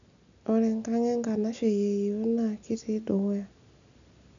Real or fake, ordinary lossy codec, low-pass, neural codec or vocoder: real; none; 7.2 kHz; none